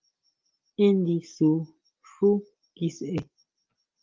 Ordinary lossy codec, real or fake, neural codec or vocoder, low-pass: Opus, 32 kbps; real; none; 7.2 kHz